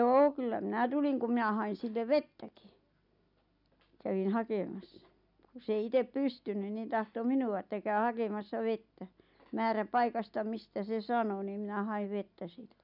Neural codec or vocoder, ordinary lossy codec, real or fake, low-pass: none; none; real; 5.4 kHz